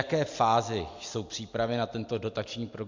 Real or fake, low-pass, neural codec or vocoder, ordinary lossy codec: real; 7.2 kHz; none; MP3, 48 kbps